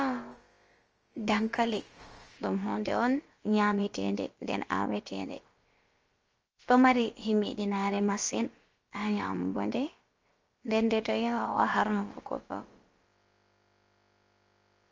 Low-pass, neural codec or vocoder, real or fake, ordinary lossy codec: 7.2 kHz; codec, 16 kHz, about 1 kbps, DyCAST, with the encoder's durations; fake; Opus, 24 kbps